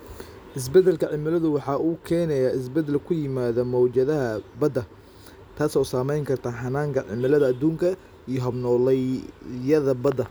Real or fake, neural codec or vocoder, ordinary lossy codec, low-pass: real; none; none; none